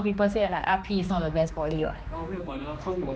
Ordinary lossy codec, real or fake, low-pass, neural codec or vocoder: none; fake; none; codec, 16 kHz, 2 kbps, X-Codec, HuBERT features, trained on general audio